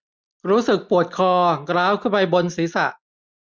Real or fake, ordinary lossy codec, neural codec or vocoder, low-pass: real; none; none; none